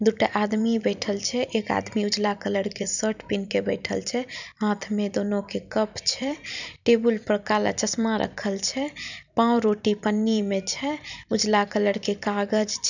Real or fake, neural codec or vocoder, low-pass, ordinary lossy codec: fake; vocoder, 44.1 kHz, 128 mel bands every 256 samples, BigVGAN v2; 7.2 kHz; none